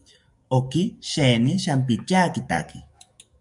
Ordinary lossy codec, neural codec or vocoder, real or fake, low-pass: MP3, 96 kbps; codec, 44.1 kHz, 7.8 kbps, DAC; fake; 10.8 kHz